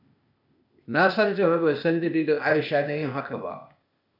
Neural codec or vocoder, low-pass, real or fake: codec, 16 kHz, 0.8 kbps, ZipCodec; 5.4 kHz; fake